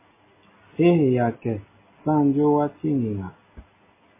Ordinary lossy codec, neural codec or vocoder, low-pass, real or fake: MP3, 16 kbps; none; 3.6 kHz; real